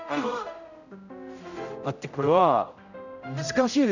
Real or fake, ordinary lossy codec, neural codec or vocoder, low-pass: fake; none; codec, 16 kHz, 0.5 kbps, X-Codec, HuBERT features, trained on general audio; 7.2 kHz